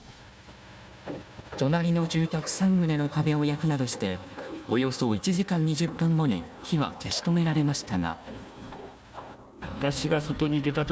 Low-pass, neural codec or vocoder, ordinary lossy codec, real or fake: none; codec, 16 kHz, 1 kbps, FunCodec, trained on Chinese and English, 50 frames a second; none; fake